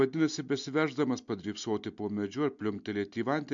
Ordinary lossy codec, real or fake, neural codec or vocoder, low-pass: MP3, 64 kbps; real; none; 7.2 kHz